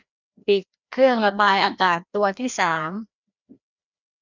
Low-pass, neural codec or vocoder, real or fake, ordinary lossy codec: 7.2 kHz; codec, 16 kHz, 1 kbps, FreqCodec, larger model; fake; none